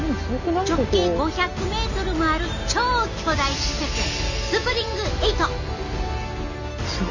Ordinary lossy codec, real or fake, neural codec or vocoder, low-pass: none; real; none; 7.2 kHz